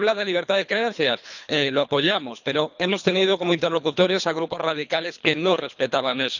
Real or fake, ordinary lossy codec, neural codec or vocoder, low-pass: fake; none; codec, 24 kHz, 3 kbps, HILCodec; 7.2 kHz